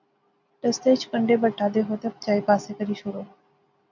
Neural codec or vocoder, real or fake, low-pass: none; real; 7.2 kHz